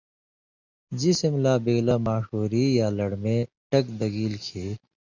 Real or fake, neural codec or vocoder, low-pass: real; none; 7.2 kHz